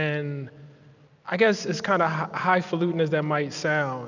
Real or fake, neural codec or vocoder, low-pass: real; none; 7.2 kHz